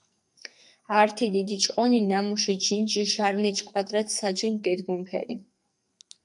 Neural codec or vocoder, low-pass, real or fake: codec, 44.1 kHz, 2.6 kbps, SNAC; 10.8 kHz; fake